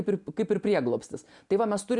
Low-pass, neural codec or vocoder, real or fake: 10.8 kHz; none; real